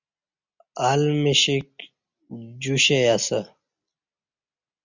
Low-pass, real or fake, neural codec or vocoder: 7.2 kHz; real; none